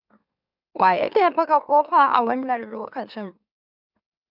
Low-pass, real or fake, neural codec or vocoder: 5.4 kHz; fake; autoencoder, 44.1 kHz, a latent of 192 numbers a frame, MeloTTS